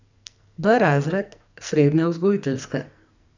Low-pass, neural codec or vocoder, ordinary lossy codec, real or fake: 7.2 kHz; codec, 44.1 kHz, 2.6 kbps, SNAC; none; fake